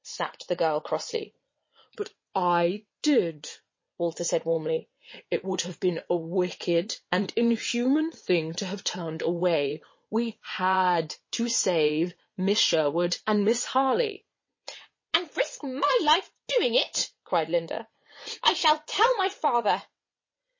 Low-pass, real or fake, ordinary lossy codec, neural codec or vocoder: 7.2 kHz; fake; MP3, 32 kbps; vocoder, 22.05 kHz, 80 mel bands, WaveNeXt